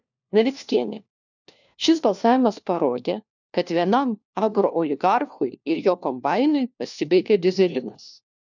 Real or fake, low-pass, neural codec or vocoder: fake; 7.2 kHz; codec, 16 kHz, 1 kbps, FunCodec, trained on LibriTTS, 50 frames a second